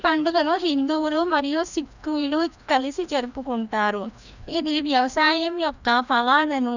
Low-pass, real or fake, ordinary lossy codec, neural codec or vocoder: 7.2 kHz; fake; none; codec, 16 kHz, 1 kbps, FreqCodec, larger model